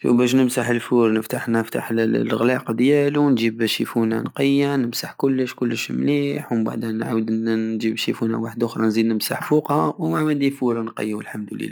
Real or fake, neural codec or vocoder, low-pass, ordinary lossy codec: real; none; none; none